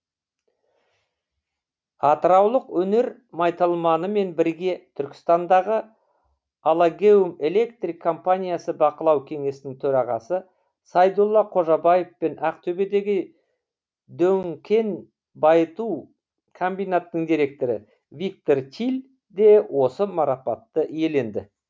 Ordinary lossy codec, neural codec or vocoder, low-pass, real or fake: none; none; none; real